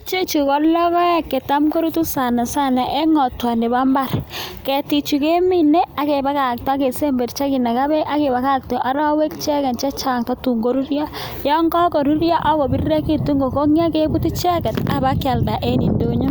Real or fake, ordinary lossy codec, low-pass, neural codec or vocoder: real; none; none; none